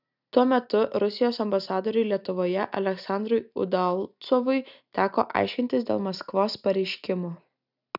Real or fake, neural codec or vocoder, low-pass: real; none; 5.4 kHz